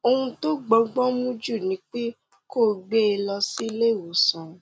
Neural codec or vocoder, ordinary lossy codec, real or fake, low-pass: none; none; real; none